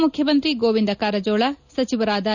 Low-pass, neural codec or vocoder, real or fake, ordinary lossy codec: 7.2 kHz; none; real; none